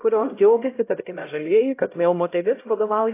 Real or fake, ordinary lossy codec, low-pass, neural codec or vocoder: fake; AAC, 24 kbps; 3.6 kHz; codec, 16 kHz, 0.5 kbps, X-Codec, HuBERT features, trained on LibriSpeech